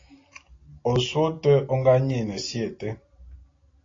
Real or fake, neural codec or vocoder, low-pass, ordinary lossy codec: real; none; 7.2 kHz; AAC, 32 kbps